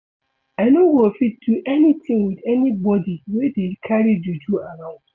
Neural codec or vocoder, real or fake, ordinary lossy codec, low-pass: none; real; none; 7.2 kHz